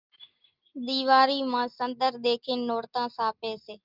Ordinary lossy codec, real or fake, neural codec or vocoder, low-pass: Opus, 24 kbps; real; none; 5.4 kHz